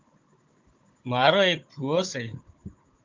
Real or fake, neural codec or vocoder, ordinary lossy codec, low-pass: fake; codec, 16 kHz, 16 kbps, FunCodec, trained on Chinese and English, 50 frames a second; Opus, 24 kbps; 7.2 kHz